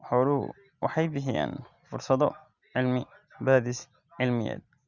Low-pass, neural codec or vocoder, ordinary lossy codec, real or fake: 7.2 kHz; none; Opus, 64 kbps; real